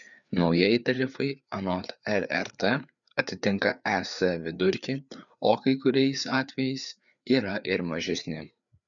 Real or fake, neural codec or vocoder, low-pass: fake; codec, 16 kHz, 4 kbps, FreqCodec, larger model; 7.2 kHz